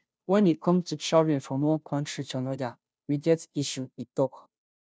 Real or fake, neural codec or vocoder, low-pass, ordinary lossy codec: fake; codec, 16 kHz, 0.5 kbps, FunCodec, trained on Chinese and English, 25 frames a second; none; none